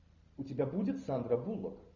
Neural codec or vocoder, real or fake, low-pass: none; real; 7.2 kHz